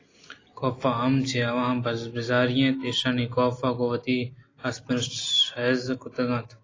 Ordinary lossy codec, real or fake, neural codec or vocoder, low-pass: AAC, 32 kbps; real; none; 7.2 kHz